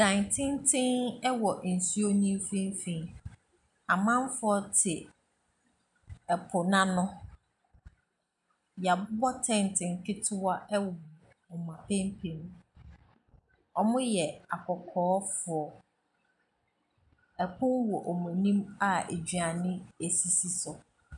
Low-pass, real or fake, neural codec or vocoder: 10.8 kHz; real; none